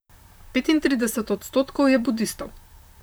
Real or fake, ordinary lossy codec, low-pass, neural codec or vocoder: fake; none; none; vocoder, 44.1 kHz, 128 mel bands, Pupu-Vocoder